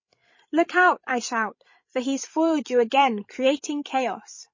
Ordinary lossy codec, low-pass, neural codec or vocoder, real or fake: MP3, 32 kbps; 7.2 kHz; codec, 16 kHz, 16 kbps, FreqCodec, larger model; fake